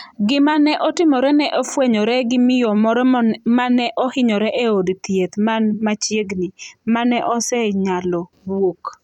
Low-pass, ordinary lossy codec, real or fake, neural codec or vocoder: 19.8 kHz; none; fake; vocoder, 44.1 kHz, 128 mel bands every 256 samples, BigVGAN v2